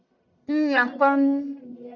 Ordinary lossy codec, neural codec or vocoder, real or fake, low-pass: MP3, 64 kbps; codec, 44.1 kHz, 1.7 kbps, Pupu-Codec; fake; 7.2 kHz